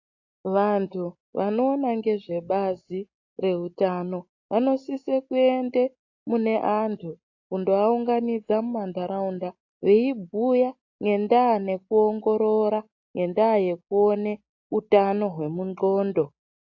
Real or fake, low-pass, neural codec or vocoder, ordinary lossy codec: real; 7.2 kHz; none; AAC, 48 kbps